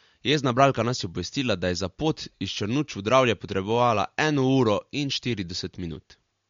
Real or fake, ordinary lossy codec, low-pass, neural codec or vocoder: real; MP3, 48 kbps; 7.2 kHz; none